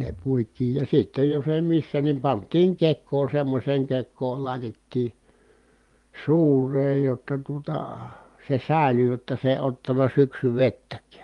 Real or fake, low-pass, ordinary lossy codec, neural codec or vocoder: fake; 10.8 kHz; Opus, 32 kbps; vocoder, 24 kHz, 100 mel bands, Vocos